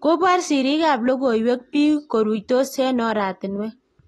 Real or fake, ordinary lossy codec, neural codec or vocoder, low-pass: real; AAC, 32 kbps; none; 19.8 kHz